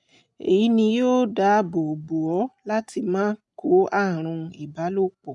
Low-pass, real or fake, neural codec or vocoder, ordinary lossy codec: 10.8 kHz; real; none; none